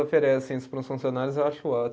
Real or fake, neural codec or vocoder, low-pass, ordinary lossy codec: real; none; none; none